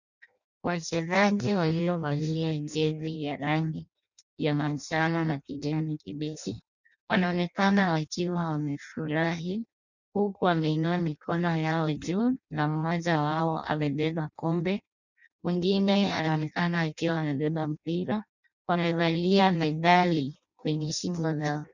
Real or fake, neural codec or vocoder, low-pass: fake; codec, 16 kHz in and 24 kHz out, 0.6 kbps, FireRedTTS-2 codec; 7.2 kHz